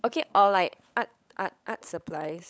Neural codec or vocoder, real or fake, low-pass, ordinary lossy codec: codec, 16 kHz, 16 kbps, FunCodec, trained on LibriTTS, 50 frames a second; fake; none; none